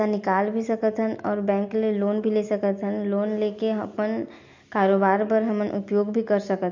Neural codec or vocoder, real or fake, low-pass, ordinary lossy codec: none; real; 7.2 kHz; MP3, 48 kbps